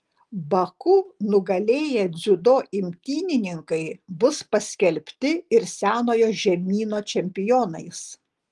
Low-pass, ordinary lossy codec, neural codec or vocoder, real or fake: 10.8 kHz; Opus, 24 kbps; none; real